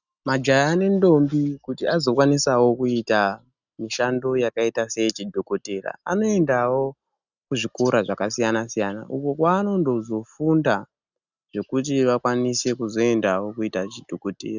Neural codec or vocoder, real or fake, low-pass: none; real; 7.2 kHz